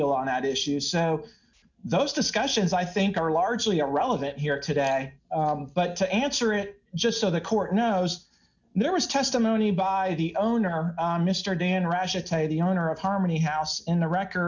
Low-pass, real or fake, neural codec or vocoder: 7.2 kHz; real; none